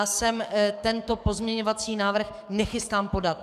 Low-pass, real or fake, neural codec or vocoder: 14.4 kHz; fake; codec, 44.1 kHz, 7.8 kbps, DAC